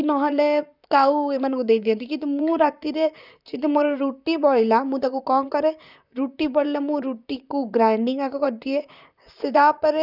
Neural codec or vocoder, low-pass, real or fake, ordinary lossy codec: vocoder, 44.1 kHz, 128 mel bands, Pupu-Vocoder; 5.4 kHz; fake; none